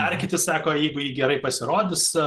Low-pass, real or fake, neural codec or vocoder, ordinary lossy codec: 10.8 kHz; real; none; Opus, 16 kbps